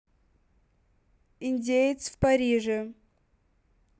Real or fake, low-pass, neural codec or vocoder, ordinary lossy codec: real; none; none; none